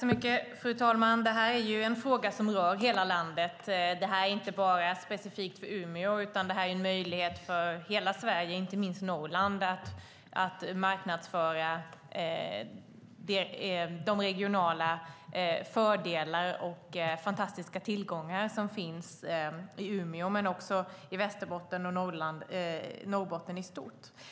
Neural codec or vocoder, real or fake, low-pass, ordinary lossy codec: none; real; none; none